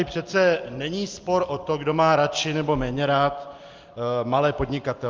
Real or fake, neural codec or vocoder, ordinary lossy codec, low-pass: real; none; Opus, 24 kbps; 7.2 kHz